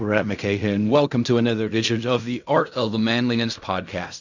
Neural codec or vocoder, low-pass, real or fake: codec, 16 kHz in and 24 kHz out, 0.4 kbps, LongCat-Audio-Codec, fine tuned four codebook decoder; 7.2 kHz; fake